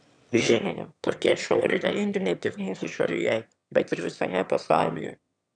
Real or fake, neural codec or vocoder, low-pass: fake; autoencoder, 22.05 kHz, a latent of 192 numbers a frame, VITS, trained on one speaker; 9.9 kHz